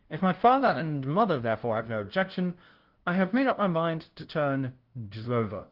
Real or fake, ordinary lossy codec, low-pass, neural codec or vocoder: fake; Opus, 16 kbps; 5.4 kHz; codec, 16 kHz, 0.5 kbps, FunCodec, trained on LibriTTS, 25 frames a second